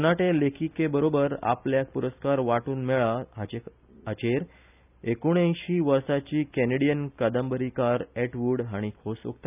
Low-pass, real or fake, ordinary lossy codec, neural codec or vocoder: 3.6 kHz; real; none; none